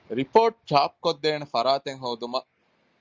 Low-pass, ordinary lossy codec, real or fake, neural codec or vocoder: 7.2 kHz; Opus, 32 kbps; real; none